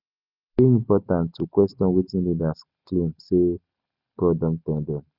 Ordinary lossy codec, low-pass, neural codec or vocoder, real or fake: Opus, 64 kbps; 5.4 kHz; none; real